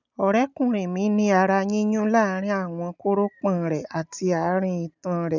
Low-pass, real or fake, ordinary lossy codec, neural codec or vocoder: 7.2 kHz; real; none; none